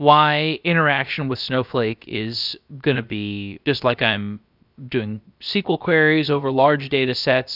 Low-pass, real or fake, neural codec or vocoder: 5.4 kHz; fake; codec, 16 kHz, about 1 kbps, DyCAST, with the encoder's durations